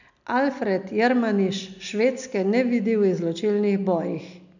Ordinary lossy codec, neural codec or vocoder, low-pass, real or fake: none; none; 7.2 kHz; real